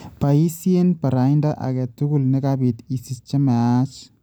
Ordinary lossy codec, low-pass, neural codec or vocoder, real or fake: none; none; none; real